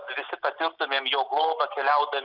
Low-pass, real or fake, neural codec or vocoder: 5.4 kHz; real; none